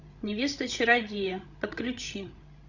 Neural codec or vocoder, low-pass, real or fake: codec, 16 kHz, 16 kbps, FreqCodec, larger model; 7.2 kHz; fake